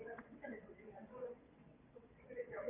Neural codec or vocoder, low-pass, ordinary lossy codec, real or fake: vocoder, 22.05 kHz, 80 mel bands, WaveNeXt; 3.6 kHz; AAC, 24 kbps; fake